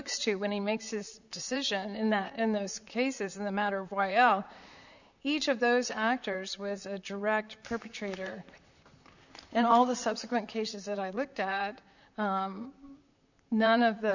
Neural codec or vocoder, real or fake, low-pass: vocoder, 44.1 kHz, 128 mel bands, Pupu-Vocoder; fake; 7.2 kHz